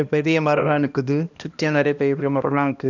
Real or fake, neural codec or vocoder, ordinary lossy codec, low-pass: fake; codec, 16 kHz, 1 kbps, X-Codec, HuBERT features, trained on balanced general audio; none; 7.2 kHz